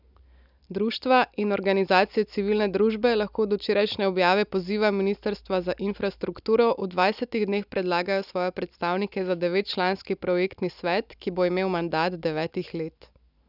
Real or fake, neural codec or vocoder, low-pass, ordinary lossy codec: real; none; 5.4 kHz; none